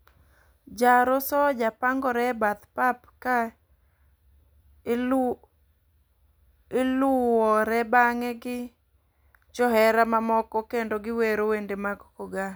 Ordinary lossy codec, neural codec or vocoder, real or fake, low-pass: none; none; real; none